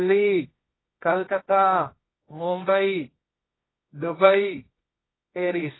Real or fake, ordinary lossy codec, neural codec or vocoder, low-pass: fake; AAC, 16 kbps; codec, 24 kHz, 0.9 kbps, WavTokenizer, medium music audio release; 7.2 kHz